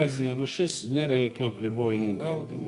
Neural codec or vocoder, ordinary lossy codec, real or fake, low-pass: codec, 24 kHz, 0.9 kbps, WavTokenizer, medium music audio release; Opus, 64 kbps; fake; 10.8 kHz